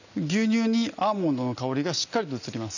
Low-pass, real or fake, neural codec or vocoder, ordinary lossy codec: 7.2 kHz; real; none; none